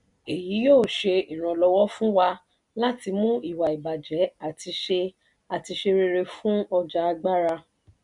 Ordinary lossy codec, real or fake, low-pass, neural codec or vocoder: none; real; 10.8 kHz; none